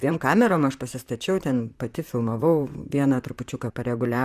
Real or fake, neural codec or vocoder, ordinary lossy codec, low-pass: fake; vocoder, 44.1 kHz, 128 mel bands, Pupu-Vocoder; Opus, 64 kbps; 14.4 kHz